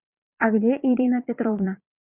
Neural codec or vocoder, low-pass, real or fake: vocoder, 22.05 kHz, 80 mel bands, Vocos; 3.6 kHz; fake